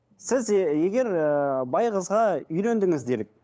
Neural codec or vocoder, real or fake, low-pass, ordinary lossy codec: codec, 16 kHz, 8 kbps, FunCodec, trained on LibriTTS, 25 frames a second; fake; none; none